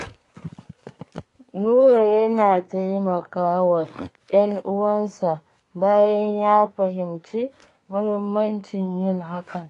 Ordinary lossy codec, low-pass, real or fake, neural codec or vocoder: AAC, 48 kbps; 10.8 kHz; fake; codec, 24 kHz, 1 kbps, SNAC